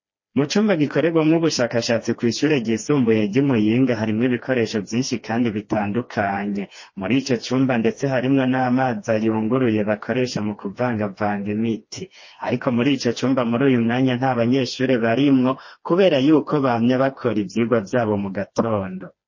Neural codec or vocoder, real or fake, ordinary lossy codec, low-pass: codec, 16 kHz, 2 kbps, FreqCodec, smaller model; fake; MP3, 32 kbps; 7.2 kHz